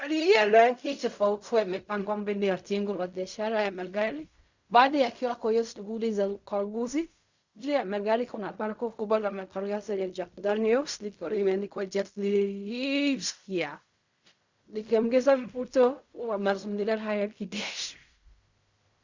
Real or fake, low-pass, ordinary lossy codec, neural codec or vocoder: fake; 7.2 kHz; Opus, 64 kbps; codec, 16 kHz in and 24 kHz out, 0.4 kbps, LongCat-Audio-Codec, fine tuned four codebook decoder